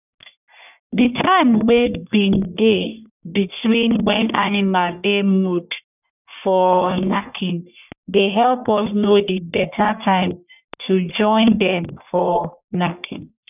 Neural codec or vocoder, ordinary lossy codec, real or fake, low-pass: codec, 44.1 kHz, 1.7 kbps, Pupu-Codec; none; fake; 3.6 kHz